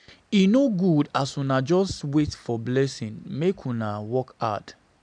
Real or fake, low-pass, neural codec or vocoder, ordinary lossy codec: real; 9.9 kHz; none; none